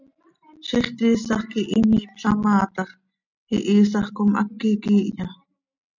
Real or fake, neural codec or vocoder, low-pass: real; none; 7.2 kHz